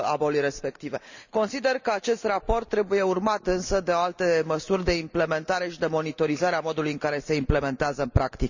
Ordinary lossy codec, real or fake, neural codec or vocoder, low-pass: none; real; none; 7.2 kHz